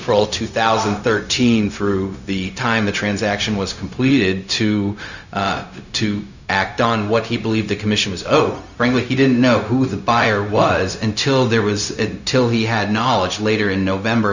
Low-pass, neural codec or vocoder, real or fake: 7.2 kHz; codec, 16 kHz, 0.4 kbps, LongCat-Audio-Codec; fake